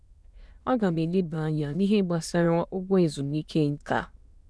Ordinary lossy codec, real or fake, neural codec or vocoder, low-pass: none; fake; autoencoder, 22.05 kHz, a latent of 192 numbers a frame, VITS, trained on many speakers; none